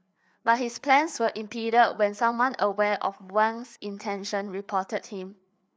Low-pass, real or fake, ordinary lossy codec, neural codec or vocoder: none; fake; none; codec, 16 kHz, 4 kbps, FreqCodec, larger model